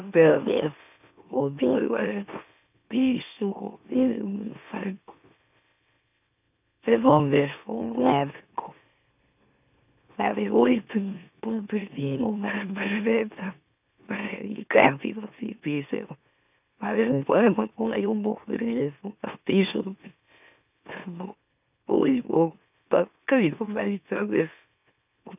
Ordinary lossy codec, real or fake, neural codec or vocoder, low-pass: none; fake; autoencoder, 44.1 kHz, a latent of 192 numbers a frame, MeloTTS; 3.6 kHz